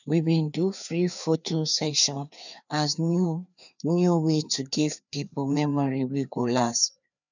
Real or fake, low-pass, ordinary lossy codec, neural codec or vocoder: fake; 7.2 kHz; none; codec, 16 kHz, 2 kbps, FreqCodec, larger model